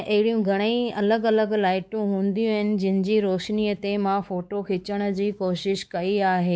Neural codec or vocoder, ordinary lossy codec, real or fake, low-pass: codec, 16 kHz, 4 kbps, X-Codec, WavLM features, trained on Multilingual LibriSpeech; none; fake; none